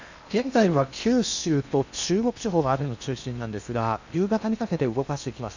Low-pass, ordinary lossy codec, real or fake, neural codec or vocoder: 7.2 kHz; none; fake; codec, 16 kHz in and 24 kHz out, 0.8 kbps, FocalCodec, streaming, 65536 codes